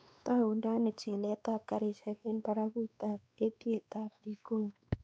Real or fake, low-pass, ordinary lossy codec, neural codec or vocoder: fake; none; none; codec, 16 kHz, 2 kbps, X-Codec, WavLM features, trained on Multilingual LibriSpeech